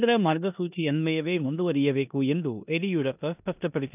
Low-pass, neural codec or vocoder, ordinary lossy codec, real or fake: 3.6 kHz; codec, 16 kHz in and 24 kHz out, 0.9 kbps, LongCat-Audio-Codec, four codebook decoder; none; fake